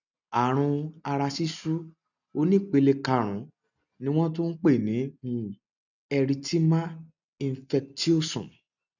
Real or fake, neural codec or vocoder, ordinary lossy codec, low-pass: real; none; none; 7.2 kHz